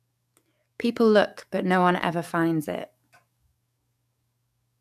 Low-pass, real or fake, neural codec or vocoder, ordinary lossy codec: 14.4 kHz; fake; codec, 44.1 kHz, 7.8 kbps, DAC; AAC, 96 kbps